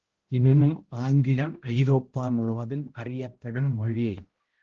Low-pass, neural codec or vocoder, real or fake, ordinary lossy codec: 7.2 kHz; codec, 16 kHz, 0.5 kbps, X-Codec, HuBERT features, trained on balanced general audio; fake; Opus, 16 kbps